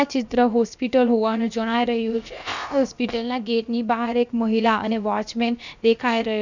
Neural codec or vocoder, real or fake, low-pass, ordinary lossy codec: codec, 16 kHz, about 1 kbps, DyCAST, with the encoder's durations; fake; 7.2 kHz; none